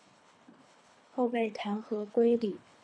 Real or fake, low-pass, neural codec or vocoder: fake; 9.9 kHz; codec, 24 kHz, 3 kbps, HILCodec